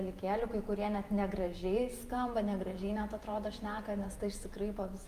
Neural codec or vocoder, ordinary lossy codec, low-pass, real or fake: vocoder, 48 kHz, 128 mel bands, Vocos; Opus, 32 kbps; 14.4 kHz; fake